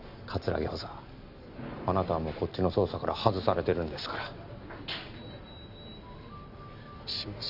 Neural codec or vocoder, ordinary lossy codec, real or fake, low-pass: none; AAC, 48 kbps; real; 5.4 kHz